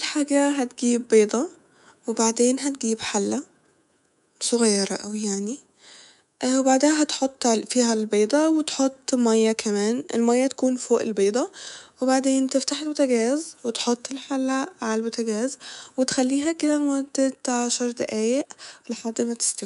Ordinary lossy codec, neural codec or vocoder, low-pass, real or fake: none; codec, 24 kHz, 3.1 kbps, DualCodec; 10.8 kHz; fake